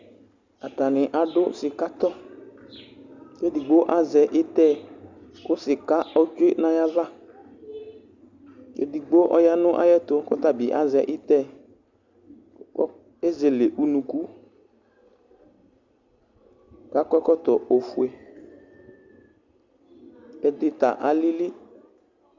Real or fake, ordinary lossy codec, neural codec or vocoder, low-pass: real; Opus, 64 kbps; none; 7.2 kHz